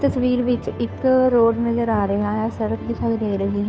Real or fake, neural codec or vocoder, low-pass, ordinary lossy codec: fake; codec, 16 kHz, 2 kbps, FunCodec, trained on Chinese and English, 25 frames a second; none; none